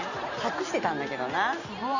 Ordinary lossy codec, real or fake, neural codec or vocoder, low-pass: none; real; none; 7.2 kHz